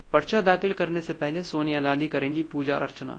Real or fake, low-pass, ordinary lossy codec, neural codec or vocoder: fake; 9.9 kHz; AAC, 32 kbps; codec, 24 kHz, 0.9 kbps, WavTokenizer, large speech release